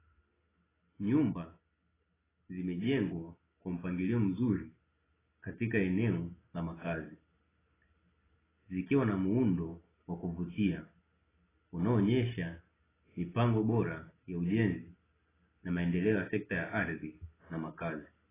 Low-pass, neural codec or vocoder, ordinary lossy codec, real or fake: 3.6 kHz; none; AAC, 16 kbps; real